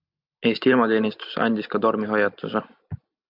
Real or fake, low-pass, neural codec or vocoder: real; 5.4 kHz; none